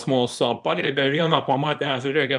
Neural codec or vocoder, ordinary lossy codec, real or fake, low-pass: codec, 24 kHz, 0.9 kbps, WavTokenizer, small release; MP3, 96 kbps; fake; 10.8 kHz